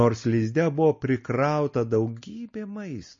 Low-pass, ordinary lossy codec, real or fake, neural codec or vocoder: 7.2 kHz; MP3, 32 kbps; real; none